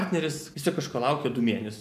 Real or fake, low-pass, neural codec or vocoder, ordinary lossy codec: real; 14.4 kHz; none; MP3, 96 kbps